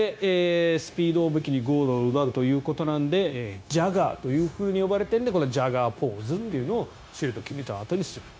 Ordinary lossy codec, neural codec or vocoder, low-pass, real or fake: none; codec, 16 kHz, 0.9 kbps, LongCat-Audio-Codec; none; fake